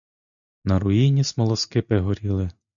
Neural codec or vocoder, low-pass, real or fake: none; 7.2 kHz; real